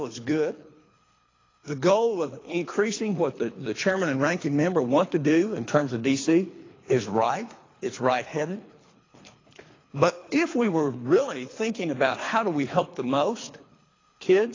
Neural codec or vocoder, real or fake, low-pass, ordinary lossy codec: codec, 24 kHz, 3 kbps, HILCodec; fake; 7.2 kHz; AAC, 32 kbps